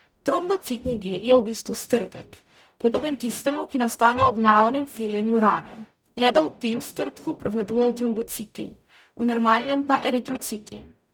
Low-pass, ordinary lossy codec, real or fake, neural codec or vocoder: none; none; fake; codec, 44.1 kHz, 0.9 kbps, DAC